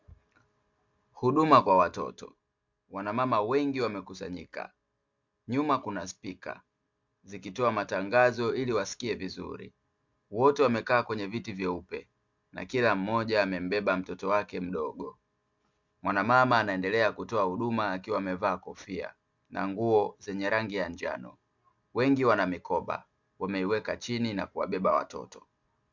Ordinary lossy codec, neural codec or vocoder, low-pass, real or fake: MP3, 64 kbps; none; 7.2 kHz; real